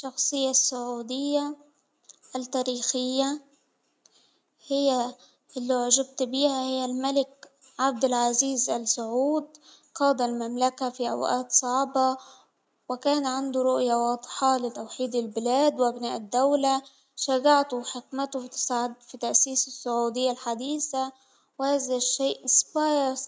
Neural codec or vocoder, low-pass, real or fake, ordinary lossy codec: none; none; real; none